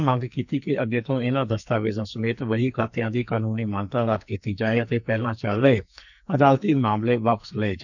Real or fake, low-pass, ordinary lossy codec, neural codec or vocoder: fake; 7.2 kHz; none; codec, 44.1 kHz, 2.6 kbps, SNAC